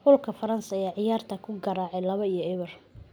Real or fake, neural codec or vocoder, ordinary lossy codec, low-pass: real; none; none; none